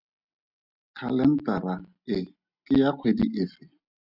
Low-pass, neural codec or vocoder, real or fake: 5.4 kHz; none; real